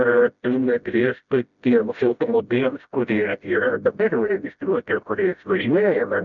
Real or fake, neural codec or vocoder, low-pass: fake; codec, 16 kHz, 0.5 kbps, FreqCodec, smaller model; 7.2 kHz